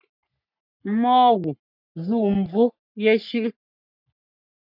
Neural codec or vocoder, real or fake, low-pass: codec, 44.1 kHz, 3.4 kbps, Pupu-Codec; fake; 5.4 kHz